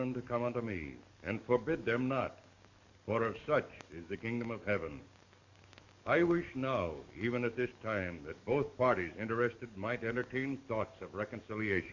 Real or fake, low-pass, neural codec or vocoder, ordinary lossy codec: fake; 7.2 kHz; codec, 44.1 kHz, 7.8 kbps, DAC; Opus, 64 kbps